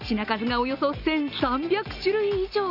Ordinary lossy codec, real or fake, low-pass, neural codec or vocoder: AAC, 48 kbps; real; 5.4 kHz; none